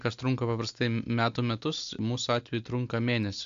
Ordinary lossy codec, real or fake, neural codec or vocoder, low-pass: AAC, 64 kbps; real; none; 7.2 kHz